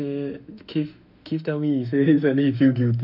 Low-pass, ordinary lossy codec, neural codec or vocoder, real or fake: 5.4 kHz; none; autoencoder, 48 kHz, 32 numbers a frame, DAC-VAE, trained on Japanese speech; fake